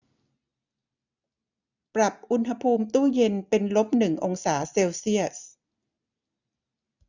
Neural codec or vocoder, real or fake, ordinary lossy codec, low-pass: none; real; none; 7.2 kHz